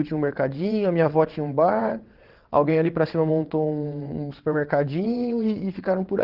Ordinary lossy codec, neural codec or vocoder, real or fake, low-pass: Opus, 16 kbps; vocoder, 22.05 kHz, 80 mel bands, WaveNeXt; fake; 5.4 kHz